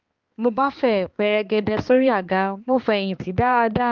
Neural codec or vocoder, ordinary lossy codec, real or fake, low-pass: codec, 16 kHz, 2 kbps, X-Codec, HuBERT features, trained on balanced general audio; Opus, 32 kbps; fake; 7.2 kHz